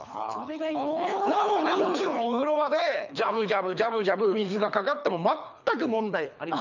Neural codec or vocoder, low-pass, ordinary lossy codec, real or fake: codec, 24 kHz, 3 kbps, HILCodec; 7.2 kHz; none; fake